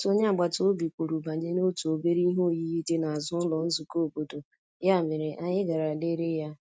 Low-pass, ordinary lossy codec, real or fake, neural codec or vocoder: none; none; real; none